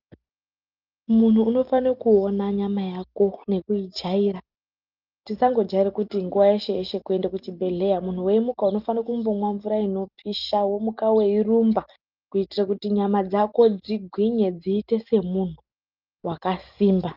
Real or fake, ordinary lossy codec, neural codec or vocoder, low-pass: real; Opus, 24 kbps; none; 5.4 kHz